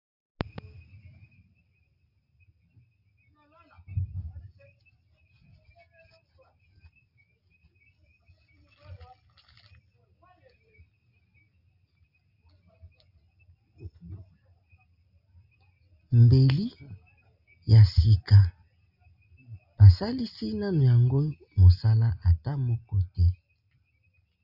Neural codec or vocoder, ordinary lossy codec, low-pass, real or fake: none; Opus, 64 kbps; 5.4 kHz; real